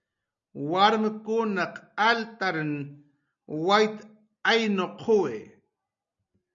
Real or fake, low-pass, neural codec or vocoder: real; 7.2 kHz; none